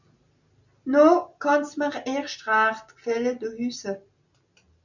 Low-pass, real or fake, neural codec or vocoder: 7.2 kHz; real; none